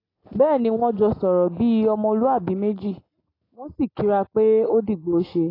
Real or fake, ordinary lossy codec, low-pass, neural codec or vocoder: real; AAC, 24 kbps; 5.4 kHz; none